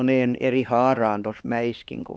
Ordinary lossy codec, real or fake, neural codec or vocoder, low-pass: none; fake; codec, 16 kHz, 2 kbps, X-Codec, HuBERT features, trained on LibriSpeech; none